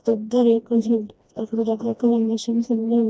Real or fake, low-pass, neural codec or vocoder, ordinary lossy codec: fake; none; codec, 16 kHz, 1 kbps, FreqCodec, smaller model; none